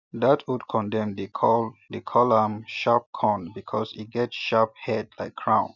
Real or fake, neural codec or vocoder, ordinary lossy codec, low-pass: real; none; none; 7.2 kHz